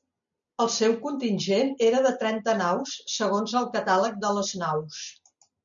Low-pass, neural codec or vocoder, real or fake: 7.2 kHz; none; real